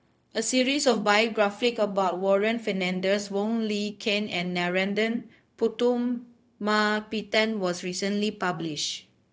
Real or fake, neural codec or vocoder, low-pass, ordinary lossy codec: fake; codec, 16 kHz, 0.4 kbps, LongCat-Audio-Codec; none; none